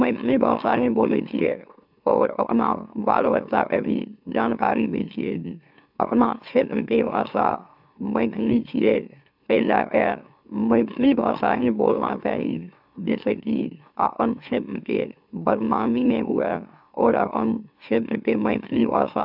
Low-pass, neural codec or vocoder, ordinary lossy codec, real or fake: 5.4 kHz; autoencoder, 44.1 kHz, a latent of 192 numbers a frame, MeloTTS; AAC, 48 kbps; fake